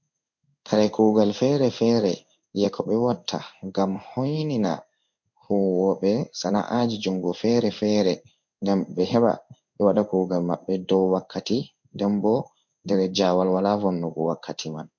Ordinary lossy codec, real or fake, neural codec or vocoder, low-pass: MP3, 48 kbps; fake; codec, 16 kHz in and 24 kHz out, 1 kbps, XY-Tokenizer; 7.2 kHz